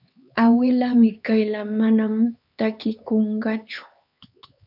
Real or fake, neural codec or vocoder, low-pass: fake; codec, 16 kHz, 4 kbps, X-Codec, HuBERT features, trained on LibriSpeech; 5.4 kHz